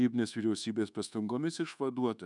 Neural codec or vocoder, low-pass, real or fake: codec, 24 kHz, 1.2 kbps, DualCodec; 10.8 kHz; fake